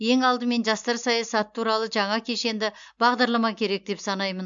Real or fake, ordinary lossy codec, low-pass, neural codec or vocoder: real; MP3, 64 kbps; 7.2 kHz; none